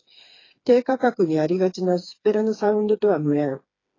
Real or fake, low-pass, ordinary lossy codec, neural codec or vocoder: fake; 7.2 kHz; AAC, 32 kbps; codec, 16 kHz, 4 kbps, FreqCodec, smaller model